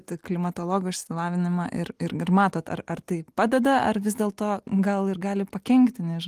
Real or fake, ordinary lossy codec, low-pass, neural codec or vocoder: real; Opus, 24 kbps; 14.4 kHz; none